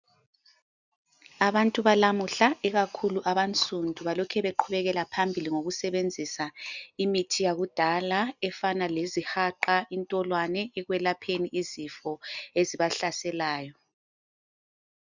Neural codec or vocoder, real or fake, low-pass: none; real; 7.2 kHz